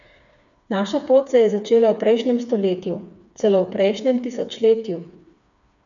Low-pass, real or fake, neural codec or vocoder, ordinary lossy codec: 7.2 kHz; fake; codec, 16 kHz, 4 kbps, FreqCodec, smaller model; none